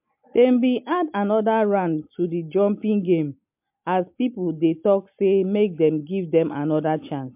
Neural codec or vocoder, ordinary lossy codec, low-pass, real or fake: none; MP3, 32 kbps; 3.6 kHz; real